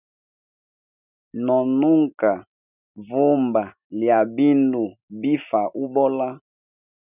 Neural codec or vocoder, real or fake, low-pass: none; real; 3.6 kHz